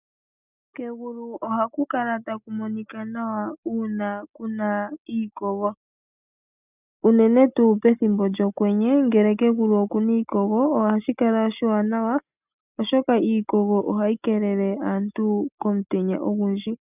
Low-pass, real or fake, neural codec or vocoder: 3.6 kHz; real; none